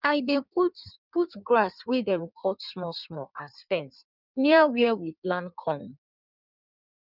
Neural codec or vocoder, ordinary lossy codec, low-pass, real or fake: codec, 16 kHz in and 24 kHz out, 1.1 kbps, FireRedTTS-2 codec; none; 5.4 kHz; fake